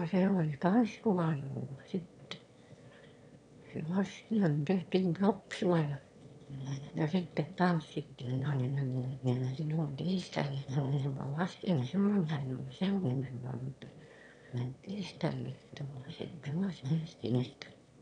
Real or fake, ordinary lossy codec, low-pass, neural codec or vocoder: fake; none; 9.9 kHz; autoencoder, 22.05 kHz, a latent of 192 numbers a frame, VITS, trained on one speaker